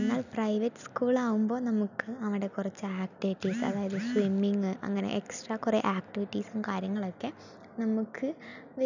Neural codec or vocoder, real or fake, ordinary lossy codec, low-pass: none; real; none; 7.2 kHz